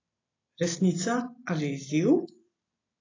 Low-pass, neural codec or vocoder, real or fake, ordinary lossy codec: 7.2 kHz; none; real; AAC, 32 kbps